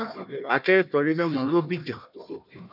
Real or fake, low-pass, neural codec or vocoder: fake; 5.4 kHz; codec, 16 kHz, 1 kbps, FunCodec, trained on Chinese and English, 50 frames a second